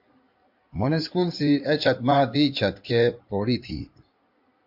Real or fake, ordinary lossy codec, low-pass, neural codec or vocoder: fake; MP3, 48 kbps; 5.4 kHz; codec, 16 kHz in and 24 kHz out, 2.2 kbps, FireRedTTS-2 codec